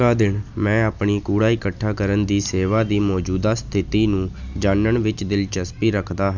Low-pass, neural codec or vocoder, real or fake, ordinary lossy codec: 7.2 kHz; none; real; none